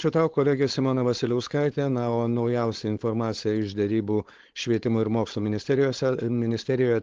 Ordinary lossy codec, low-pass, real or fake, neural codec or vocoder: Opus, 16 kbps; 7.2 kHz; fake; codec, 16 kHz, 4.8 kbps, FACodec